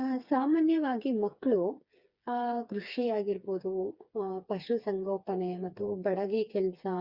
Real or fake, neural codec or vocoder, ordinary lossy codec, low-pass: fake; codec, 16 kHz, 4 kbps, FreqCodec, smaller model; Opus, 64 kbps; 5.4 kHz